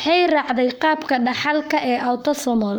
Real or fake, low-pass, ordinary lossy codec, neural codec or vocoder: fake; none; none; codec, 44.1 kHz, 7.8 kbps, Pupu-Codec